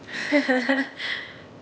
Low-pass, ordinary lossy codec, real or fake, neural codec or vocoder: none; none; fake; codec, 16 kHz, 0.8 kbps, ZipCodec